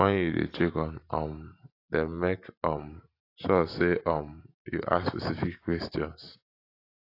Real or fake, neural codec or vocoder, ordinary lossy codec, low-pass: fake; vocoder, 44.1 kHz, 128 mel bands every 512 samples, BigVGAN v2; AAC, 32 kbps; 5.4 kHz